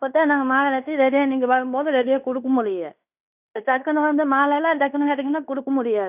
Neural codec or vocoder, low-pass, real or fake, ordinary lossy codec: codec, 16 kHz in and 24 kHz out, 0.9 kbps, LongCat-Audio-Codec, fine tuned four codebook decoder; 3.6 kHz; fake; none